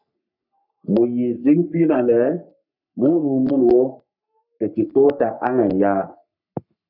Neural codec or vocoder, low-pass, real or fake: codec, 44.1 kHz, 3.4 kbps, Pupu-Codec; 5.4 kHz; fake